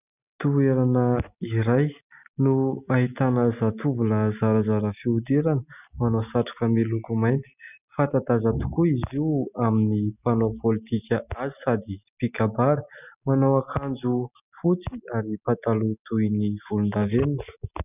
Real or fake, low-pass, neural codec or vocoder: real; 3.6 kHz; none